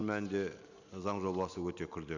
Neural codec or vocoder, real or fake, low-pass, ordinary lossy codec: none; real; 7.2 kHz; none